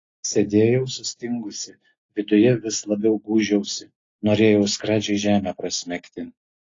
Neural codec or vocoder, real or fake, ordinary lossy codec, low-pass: none; real; AAC, 32 kbps; 7.2 kHz